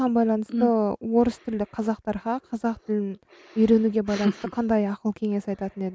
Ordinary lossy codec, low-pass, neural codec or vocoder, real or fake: none; none; none; real